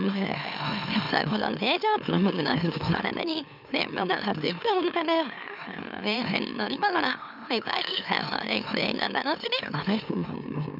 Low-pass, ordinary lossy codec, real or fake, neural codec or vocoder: 5.4 kHz; none; fake; autoencoder, 44.1 kHz, a latent of 192 numbers a frame, MeloTTS